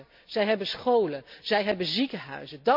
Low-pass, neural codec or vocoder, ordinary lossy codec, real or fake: 5.4 kHz; none; MP3, 48 kbps; real